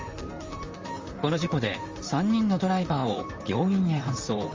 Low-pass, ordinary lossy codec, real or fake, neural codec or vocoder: 7.2 kHz; Opus, 32 kbps; fake; vocoder, 44.1 kHz, 128 mel bands, Pupu-Vocoder